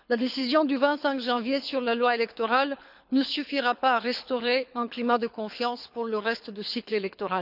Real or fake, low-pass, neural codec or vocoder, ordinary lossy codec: fake; 5.4 kHz; codec, 24 kHz, 6 kbps, HILCodec; none